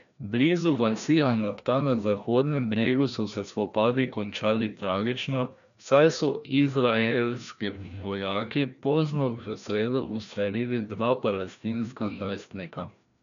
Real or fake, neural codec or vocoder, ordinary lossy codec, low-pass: fake; codec, 16 kHz, 1 kbps, FreqCodec, larger model; none; 7.2 kHz